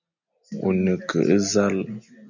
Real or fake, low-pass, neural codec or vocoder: real; 7.2 kHz; none